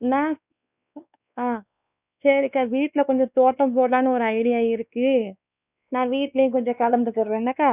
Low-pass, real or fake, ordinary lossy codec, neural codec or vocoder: 3.6 kHz; fake; none; codec, 16 kHz, 2 kbps, X-Codec, WavLM features, trained on Multilingual LibriSpeech